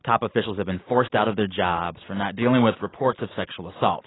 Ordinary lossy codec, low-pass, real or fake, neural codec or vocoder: AAC, 16 kbps; 7.2 kHz; real; none